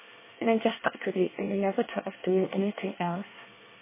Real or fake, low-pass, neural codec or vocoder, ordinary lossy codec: fake; 3.6 kHz; codec, 24 kHz, 1 kbps, SNAC; MP3, 16 kbps